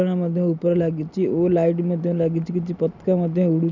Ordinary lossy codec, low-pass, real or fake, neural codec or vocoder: none; 7.2 kHz; real; none